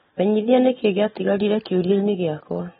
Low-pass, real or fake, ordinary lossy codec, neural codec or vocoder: 19.8 kHz; real; AAC, 16 kbps; none